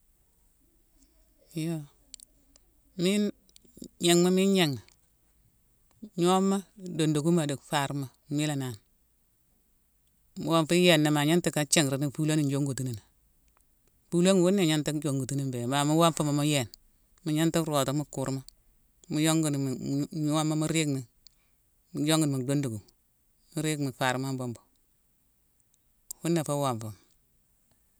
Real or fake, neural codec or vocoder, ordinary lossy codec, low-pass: real; none; none; none